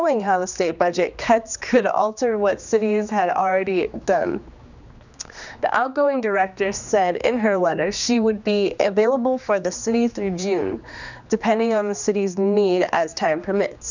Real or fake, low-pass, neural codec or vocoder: fake; 7.2 kHz; codec, 16 kHz, 2 kbps, X-Codec, HuBERT features, trained on general audio